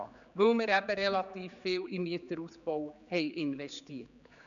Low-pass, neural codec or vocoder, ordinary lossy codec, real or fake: 7.2 kHz; codec, 16 kHz, 4 kbps, X-Codec, HuBERT features, trained on general audio; none; fake